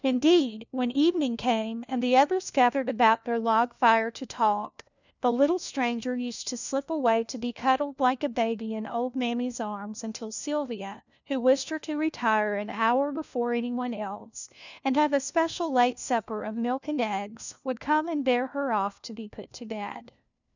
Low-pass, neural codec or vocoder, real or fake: 7.2 kHz; codec, 16 kHz, 1 kbps, FunCodec, trained on LibriTTS, 50 frames a second; fake